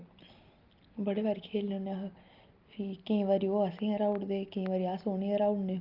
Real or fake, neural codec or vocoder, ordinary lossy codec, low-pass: real; none; Opus, 24 kbps; 5.4 kHz